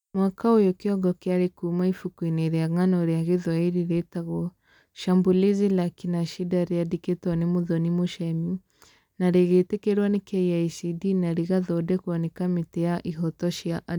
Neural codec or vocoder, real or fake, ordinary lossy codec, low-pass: none; real; none; 19.8 kHz